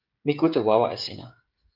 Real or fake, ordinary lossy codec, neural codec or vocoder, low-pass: fake; Opus, 32 kbps; codec, 16 kHz, 16 kbps, FreqCodec, smaller model; 5.4 kHz